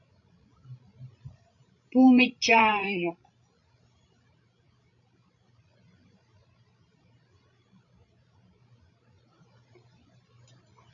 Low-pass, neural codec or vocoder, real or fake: 7.2 kHz; codec, 16 kHz, 16 kbps, FreqCodec, larger model; fake